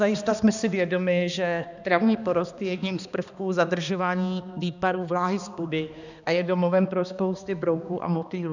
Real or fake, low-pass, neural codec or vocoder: fake; 7.2 kHz; codec, 16 kHz, 2 kbps, X-Codec, HuBERT features, trained on balanced general audio